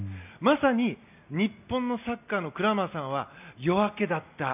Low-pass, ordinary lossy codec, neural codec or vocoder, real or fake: 3.6 kHz; none; none; real